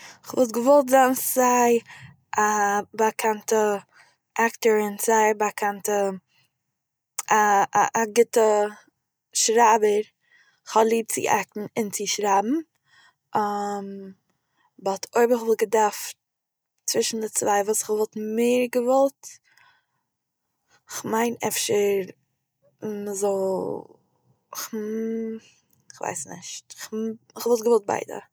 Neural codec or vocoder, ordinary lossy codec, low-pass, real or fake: none; none; none; real